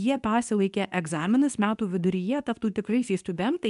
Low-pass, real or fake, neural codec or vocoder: 10.8 kHz; fake; codec, 24 kHz, 0.9 kbps, WavTokenizer, medium speech release version 2